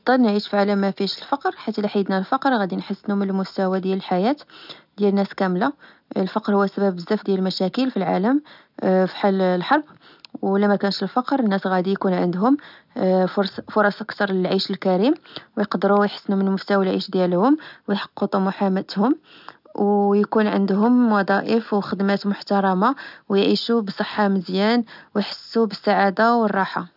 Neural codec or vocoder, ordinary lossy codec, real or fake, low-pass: none; none; real; 5.4 kHz